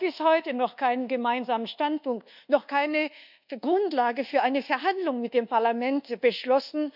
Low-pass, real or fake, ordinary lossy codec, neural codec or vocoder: 5.4 kHz; fake; none; codec, 24 kHz, 1.2 kbps, DualCodec